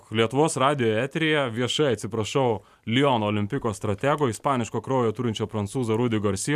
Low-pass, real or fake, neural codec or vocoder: 14.4 kHz; real; none